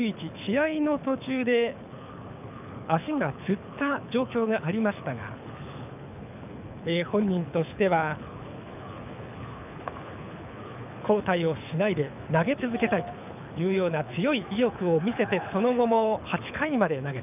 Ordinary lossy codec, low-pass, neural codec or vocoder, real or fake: none; 3.6 kHz; codec, 24 kHz, 6 kbps, HILCodec; fake